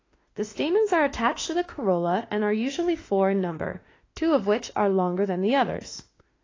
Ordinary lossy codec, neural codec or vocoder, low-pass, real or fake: AAC, 32 kbps; autoencoder, 48 kHz, 32 numbers a frame, DAC-VAE, trained on Japanese speech; 7.2 kHz; fake